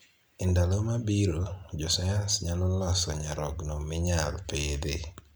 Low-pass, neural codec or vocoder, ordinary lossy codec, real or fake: none; none; none; real